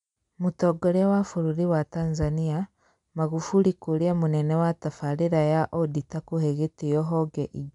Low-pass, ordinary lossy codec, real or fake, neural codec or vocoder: 10.8 kHz; none; real; none